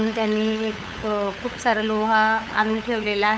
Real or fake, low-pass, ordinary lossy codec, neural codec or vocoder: fake; none; none; codec, 16 kHz, 8 kbps, FunCodec, trained on LibriTTS, 25 frames a second